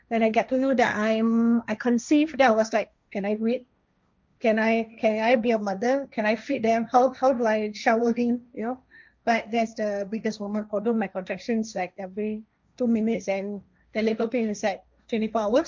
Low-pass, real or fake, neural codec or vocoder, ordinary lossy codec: 7.2 kHz; fake; codec, 16 kHz, 1.1 kbps, Voila-Tokenizer; MP3, 64 kbps